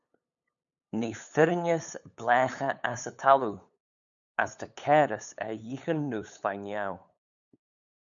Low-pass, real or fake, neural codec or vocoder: 7.2 kHz; fake; codec, 16 kHz, 8 kbps, FunCodec, trained on LibriTTS, 25 frames a second